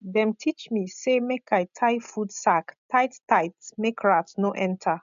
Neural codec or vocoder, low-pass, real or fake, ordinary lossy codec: none; 7.2 kHz; real; AAC, 96 kbps